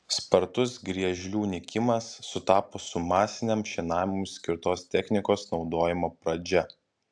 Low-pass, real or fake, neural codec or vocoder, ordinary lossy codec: 9.9 kHz; real; none; AAC, 64 kbps